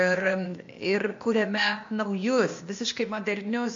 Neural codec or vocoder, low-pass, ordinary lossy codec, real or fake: codec, 16 kHz, 0.8 kbps, ZipCodec; 7.2 kHz; MP3, 48 kbps; fake